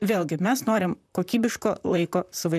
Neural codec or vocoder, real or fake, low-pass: vocoder, 44.1 kHz, 128 mel bands, Pupu-Vocoder; fake; 14.4 kHz